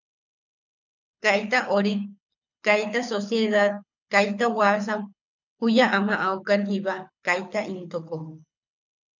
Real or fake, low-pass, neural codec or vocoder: fake; 7.2 kHz; codec, 24 kHz, 6 kbps, HILCodec